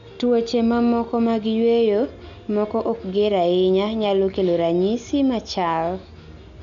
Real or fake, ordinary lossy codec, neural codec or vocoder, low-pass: real; none; none; 7.2 kHz